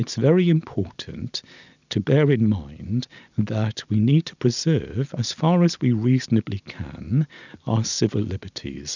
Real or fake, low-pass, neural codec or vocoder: real; 7.2 kHz; none